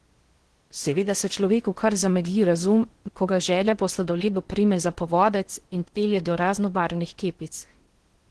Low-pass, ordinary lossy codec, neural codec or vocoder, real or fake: 10.8 kHz; Opus, 16 kbps; codec, 16 kHz in and 24 kHz out, 0.6 kbps, FocalCodec, streaming, 4096 codes; fake